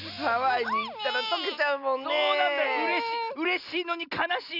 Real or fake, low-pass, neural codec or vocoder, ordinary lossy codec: real; 5.4 kHz; none; none